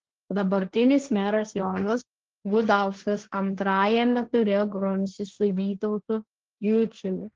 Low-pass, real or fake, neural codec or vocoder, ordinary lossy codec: 7.2 kHz; fake; codec, 16 kHz, 1.1 kbps, Voila-Tokenizer; Opus, 16 kbps